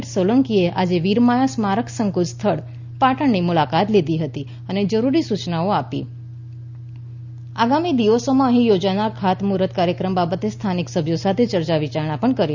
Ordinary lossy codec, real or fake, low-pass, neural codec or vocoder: Opus, 64 kbps; real; 7.2 kHz; none